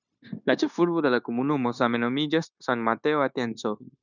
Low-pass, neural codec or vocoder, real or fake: 7.2 kHz; codec, 16 kHz, 0.9 kbps, LongCat-Audio-Codec; fake